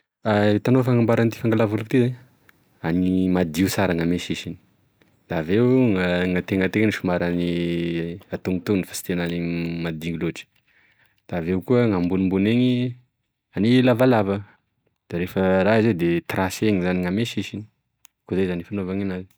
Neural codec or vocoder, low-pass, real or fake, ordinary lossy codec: none; none; real; none